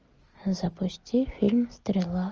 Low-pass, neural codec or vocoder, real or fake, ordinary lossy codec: 7.2 kHz; none; real; Opus, 24 kbps